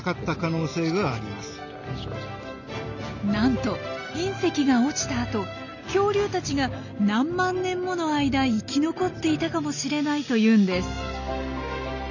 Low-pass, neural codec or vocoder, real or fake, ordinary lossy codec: 7.2 kHz; none; real; none